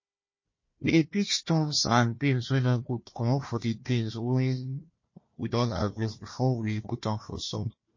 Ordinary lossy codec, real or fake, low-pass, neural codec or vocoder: MP3, 32 kbps; fake; 7.2 kHz; codec, 16 kHz, 1 kbps, FunCodec, trained on Chinese and English, 50 frames a second